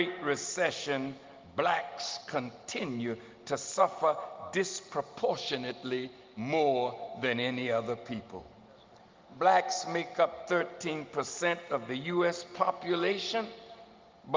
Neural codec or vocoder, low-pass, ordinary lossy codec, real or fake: none; 7.2 kHz; Opus, 32 kbps; real